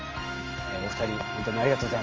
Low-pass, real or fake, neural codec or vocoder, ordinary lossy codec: 7.2 kHz; real; none; Opus, 24 kbps